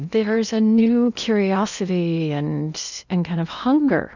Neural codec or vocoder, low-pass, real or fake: codec, 16 kHz in and 24 kHz out, 0.8 kbps, FocalCodec, streaming, 65536 codes; 7.2 kHz; fake